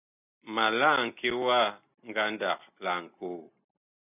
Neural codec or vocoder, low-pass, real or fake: none; 3.6 kHz; real